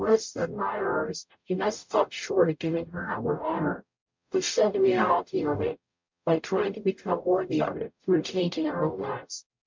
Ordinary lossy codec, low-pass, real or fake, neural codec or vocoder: MP3, 64 kbps; 7.2 kHz; fake; codec, 44.1 kHz, 0.9 kbps, DAC